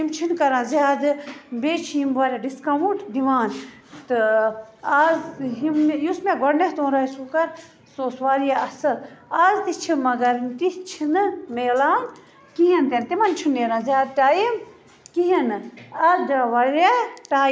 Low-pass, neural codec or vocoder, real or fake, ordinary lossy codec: none; none; real; none